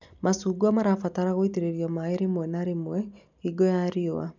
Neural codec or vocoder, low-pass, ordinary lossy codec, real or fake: none; 7.2 kHz; none; real